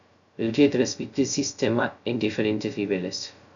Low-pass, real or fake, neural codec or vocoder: 7.2 kHz; fake; codec, 16 kHz, 0.2 kbps, FocalCodec